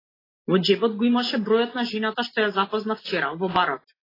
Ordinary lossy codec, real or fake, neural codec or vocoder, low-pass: AAC, 24 kbps; real; none; 5.4 kHz